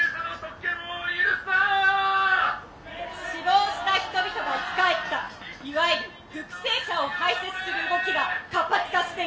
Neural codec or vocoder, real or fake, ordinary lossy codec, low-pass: none; real; none; none